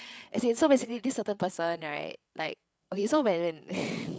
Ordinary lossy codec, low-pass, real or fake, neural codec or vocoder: none; none; fake; codec, 16 kHz, 8 kbps, FreqCodec, larger model